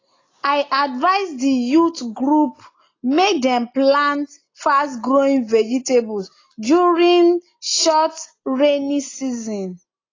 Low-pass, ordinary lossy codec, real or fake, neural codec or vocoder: 7.2 kHz; AAC, 32 kbps; real; none